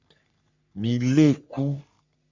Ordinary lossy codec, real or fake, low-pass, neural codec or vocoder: MP3, 64 kbps; fake; 7.2 kHz; codec, 44.1 kHz, 3.4 kbps, Pupu-Codec